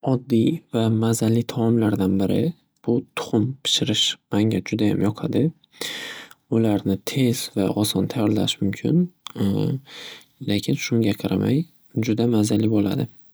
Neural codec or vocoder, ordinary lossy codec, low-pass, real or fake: none; none; none; real